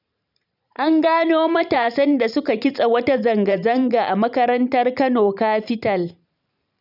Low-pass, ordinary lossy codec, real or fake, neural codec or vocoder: 5.4 kHz; none; fake; vocoder, 44.1 kHz, 128 mel bands every 512 samples, BigVGAN v2